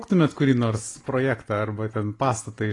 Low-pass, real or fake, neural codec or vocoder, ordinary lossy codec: 10.8 kHz; real; none; AAC, 32 kbps